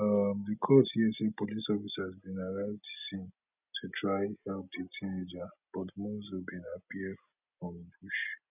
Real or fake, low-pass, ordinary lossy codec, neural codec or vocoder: real; 3.6 kHz; none; none